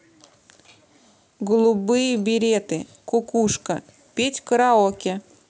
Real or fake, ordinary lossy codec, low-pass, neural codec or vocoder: real; none; none; none